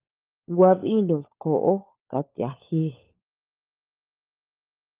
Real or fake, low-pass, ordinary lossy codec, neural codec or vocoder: fake; 3.6 kHz; Opus, 24 kbps; codec, 16 kHz, 4 kbps, FunCodec, trained on LibriTTS, 50 frames a second